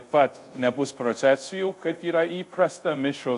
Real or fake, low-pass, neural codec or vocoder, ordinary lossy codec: fake; 10.8 kHz; codec, 24 kHz, 0.5 kbps, DualCodec; AAC, 64 kbps